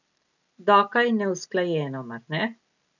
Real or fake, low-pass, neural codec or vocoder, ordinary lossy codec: real; 7.2 kHz; none; none